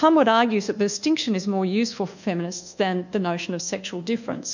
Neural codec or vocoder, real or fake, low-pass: codec, 24 kHz, 1.2 kbps, DualCodec; fake; 7.2 kHz